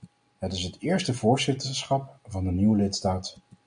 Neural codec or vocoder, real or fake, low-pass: none; real; 9.9 kHz